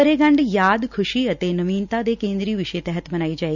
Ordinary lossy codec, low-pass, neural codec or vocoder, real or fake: none; 7.2 kHz; none; real